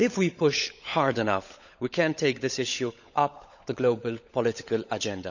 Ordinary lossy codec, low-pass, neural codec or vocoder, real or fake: none; 7.2 kHz; codec, 16 kHz, 16 kbps, FunCodec, trained on LibriTTS, 50 frames a second; fake